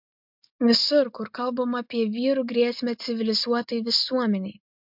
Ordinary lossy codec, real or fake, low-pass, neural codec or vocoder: MP3, 48 kbps; real; 5.4 kHz; none